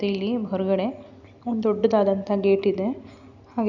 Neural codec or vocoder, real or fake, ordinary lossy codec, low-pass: none; real; none; 7.2 kHz